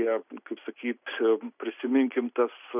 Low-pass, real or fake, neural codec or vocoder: 3.6 kHz; real; none